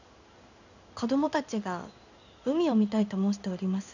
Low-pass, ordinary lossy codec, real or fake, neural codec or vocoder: 7.2 kHz; none; fake; codec, 16 kHz in and 24 kHz out, 1 kbps, XY-Tokenizer